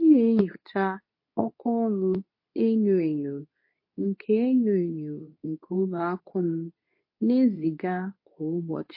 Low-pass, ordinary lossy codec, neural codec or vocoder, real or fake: 5.4 kHz; MP3, 32 kbps; codec, 24 kHz, 0.9 kbps, WavTokenizer, medium speech release version 2; fake